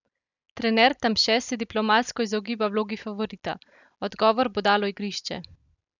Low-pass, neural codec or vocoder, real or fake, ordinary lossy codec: 7.2 kHz; none; real; none